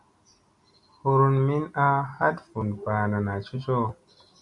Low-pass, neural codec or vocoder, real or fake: 10.8 kHz; none; real